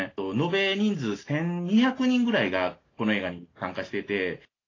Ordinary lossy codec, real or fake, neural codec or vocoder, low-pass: AAC, 32 kbps; real; none; 7.2 kHz